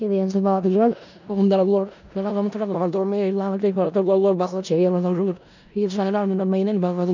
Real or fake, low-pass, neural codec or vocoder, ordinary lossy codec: fake; 7.2 kHz; codec, 16 kHz in and 24 kHz out, 0.4 kbps, LongCat-Audio-Codec, four codebook decoder; none